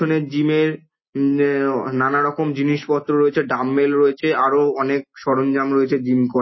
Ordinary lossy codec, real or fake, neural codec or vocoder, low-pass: MP3, 24 kbps; real; none; 7.2 kHz